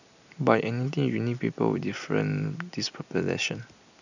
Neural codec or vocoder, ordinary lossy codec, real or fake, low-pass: none; none; real; 7.2 kHz